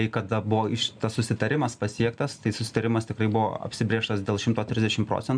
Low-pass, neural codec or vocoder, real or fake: 9.9 kHz; none; real